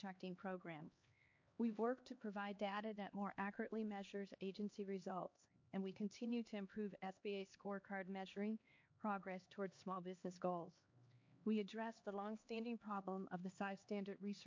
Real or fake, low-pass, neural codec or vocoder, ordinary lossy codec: fake; 7.2 kHz; codec, 16 kHz, 2 kbps, X-Codec, HuBERT features, trained on LibriSpeech; MP3, 48 kbps